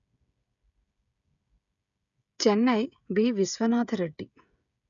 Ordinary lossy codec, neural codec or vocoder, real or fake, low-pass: none; codec, 16 kHz, 16 kbps, FreqCodec, smaller model; fake; 7.2 kHz